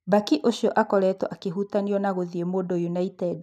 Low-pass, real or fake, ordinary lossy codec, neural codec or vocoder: 14.4 kHz; real; none; none